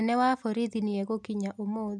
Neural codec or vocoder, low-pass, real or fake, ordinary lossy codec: none; none; real; none